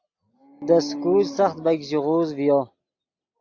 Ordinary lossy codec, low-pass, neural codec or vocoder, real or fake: AAC, 48 kbps; 7.2 kHz; none; real